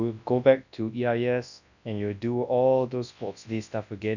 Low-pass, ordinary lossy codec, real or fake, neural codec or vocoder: 7.2 kHz; none; fake; codec, 24 kHz, 0.9 kbps, WavTokenizer, large speech release